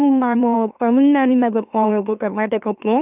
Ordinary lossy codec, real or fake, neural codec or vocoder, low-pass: none; fake; autoencoder, 44.1 kHz, a latent of 192 numbers a frame, MeloTTS; 3.6 kHz